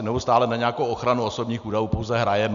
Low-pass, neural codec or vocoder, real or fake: 7.2 kHz; none; real